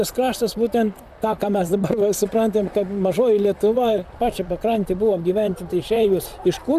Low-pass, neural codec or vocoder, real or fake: 14.4 kHz; vocoder, 44.1 kHz, 128 mel bands, Pupu-Vocoder; fake